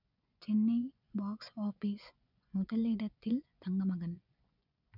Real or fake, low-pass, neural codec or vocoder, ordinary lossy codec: fake; 5.4 kHz; vocoder, 24 kHz, 100 mel bands, Vocos; none